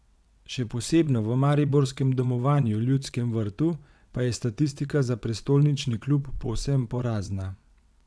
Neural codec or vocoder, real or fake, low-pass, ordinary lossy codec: vocoder, 22.05 kHz, 80 mel bands, WaveNeXt; fake; none; none